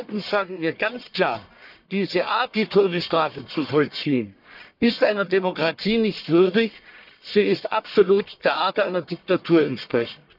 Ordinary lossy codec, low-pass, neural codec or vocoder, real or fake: none; 5.4 kHz; codec, 44.1 kHz, 1.7 kbps, Pupu-Codec; fake